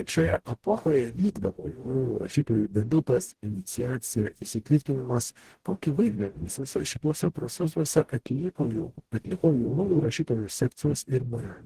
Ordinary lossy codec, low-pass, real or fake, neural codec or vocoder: Opus, 16 kbps; 14.4 kHz; fake; codec, 44.1 kHz, 0.9 kbps, DAC